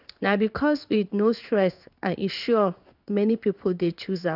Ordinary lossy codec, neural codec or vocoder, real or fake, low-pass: none; codec, 16 kHz in and 24 kHz out, 1 kbps, XY-Tokenizer; fake; 5.4 kHz